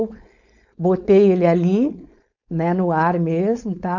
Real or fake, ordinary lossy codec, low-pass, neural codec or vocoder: fake; none; 7.2 kHz; codec, 16 kHz, 4.8 kbps, FACodec